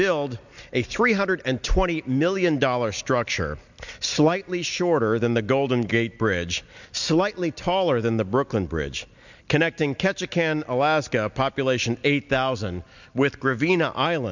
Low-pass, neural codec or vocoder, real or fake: 7.2 kHz; none; real